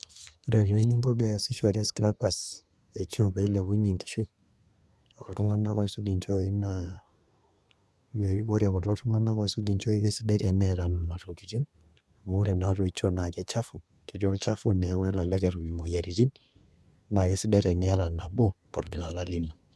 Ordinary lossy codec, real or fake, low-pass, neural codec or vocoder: none; fake; none; codec, 24 kHz, 1 kbps, SNAC